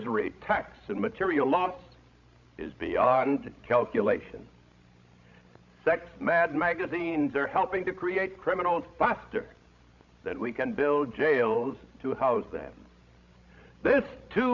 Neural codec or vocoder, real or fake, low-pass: codec, 16 kHz, 16 kbps, FreqCodec, larger model; fake; 7.2 kHz